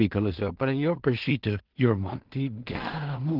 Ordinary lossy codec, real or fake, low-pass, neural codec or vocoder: Opus, 16 kbps; fake; 5.4 kHz; codec, 16 kHz in and 24 kHz out, 0.4 kbps, LongCat-Audio-Codec, two codebook decoder